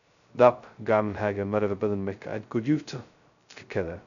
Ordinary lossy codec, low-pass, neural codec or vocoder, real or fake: none; 7.2 kHz; codec, 16 kHz, 0.2 kbps, FocalCodec; fake